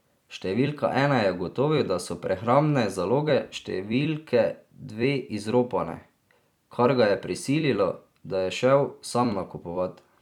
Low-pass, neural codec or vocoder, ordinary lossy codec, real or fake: 19.8 kHz; vocoder, 44.1 kHz, 128 mel bands every 256 samples, BigVGAN v2; none; fake